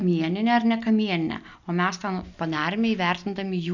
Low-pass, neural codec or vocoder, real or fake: 7.2 kHz; none; real